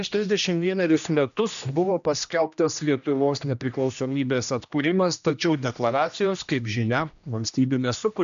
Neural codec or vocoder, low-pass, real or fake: codec, 16 kHz, 1 kbps, X-Codec, HuBERT features, trained on general audio; 7.2 kHz; fake